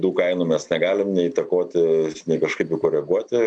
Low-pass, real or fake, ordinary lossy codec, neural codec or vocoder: 9.9 kHz; real; AAC, 64 kbps; none